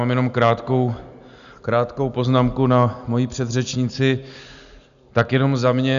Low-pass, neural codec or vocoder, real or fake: 7.2 kHz; none; real